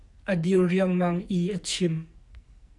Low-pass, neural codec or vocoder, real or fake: 10.8 kHz; codec, 44.1 kHz, 2.6 kbps, SNAC; fake